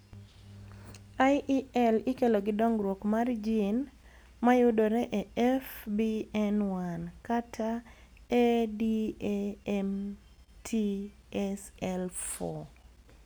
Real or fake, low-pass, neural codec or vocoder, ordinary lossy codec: real; none; none; none